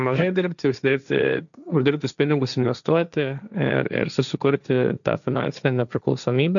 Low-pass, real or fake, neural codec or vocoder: 7.2 kHz; fake; codec, 16 kHz, 1.1 kbps, Voila-Tokenizer